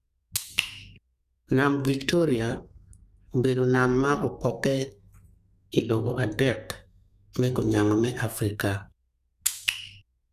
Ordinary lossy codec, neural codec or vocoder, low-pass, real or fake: none; codec, 32 kHz, 1.9 kbps, SNAC; 14.4 kHz; fake